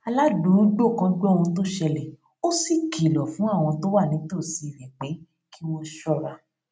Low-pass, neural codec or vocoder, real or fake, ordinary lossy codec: none; none; real; none